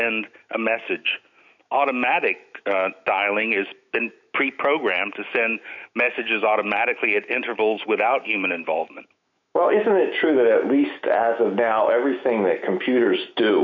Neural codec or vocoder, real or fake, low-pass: autoencoder, 48 kHz, 128 numbers a frame, DAC-VAE, trained on Japanese speech; fake; 7.2 kHz